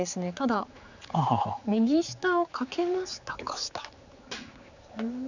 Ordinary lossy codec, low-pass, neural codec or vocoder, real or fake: none; 7.2 kHz; codec, 16 kHz, 4 kbps, X-Codec, HuBERT features, trained on general audio; fake